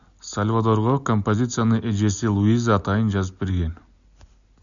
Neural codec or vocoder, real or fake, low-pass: none; real; 7.2 kHz